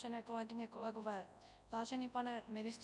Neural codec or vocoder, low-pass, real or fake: codec, 24 kHz, 0.9 kbps, WavTokenizer, large speech release; 10.8 kHz; fake